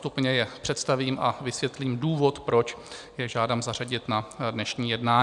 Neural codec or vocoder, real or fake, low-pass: none; real; 10.8 kHz